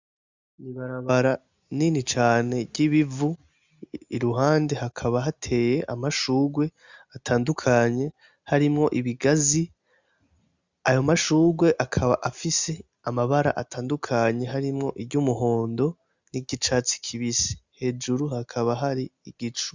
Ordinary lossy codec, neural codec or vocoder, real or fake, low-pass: Opus, 64 kbps; none; real; 7.2 kHz